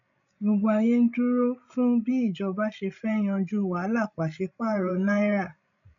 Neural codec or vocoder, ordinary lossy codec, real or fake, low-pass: codec, 16 kHz, 16 kbps, FreqCodec, larger model; none; fake; 7.2 kHz